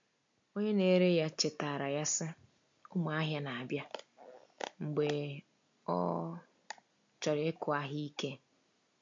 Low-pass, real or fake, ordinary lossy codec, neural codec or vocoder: 7.2 kHz; real; MP3, 48 kbps; none